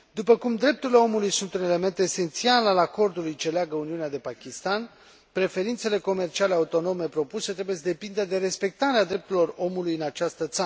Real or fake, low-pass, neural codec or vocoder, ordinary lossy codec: real; none; none; none